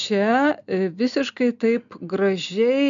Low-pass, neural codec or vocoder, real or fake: 7.2 kHz; none; real